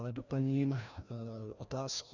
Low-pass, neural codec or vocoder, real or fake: 7.2 kHz; codec, 16 kHz, 1 kbps, FreqCodec, larger model; fake